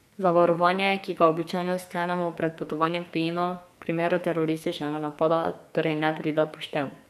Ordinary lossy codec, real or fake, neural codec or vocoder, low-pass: none; fake; codec, 44.1 kHz, 2.6 kbps, SNAC; 14.4 kHz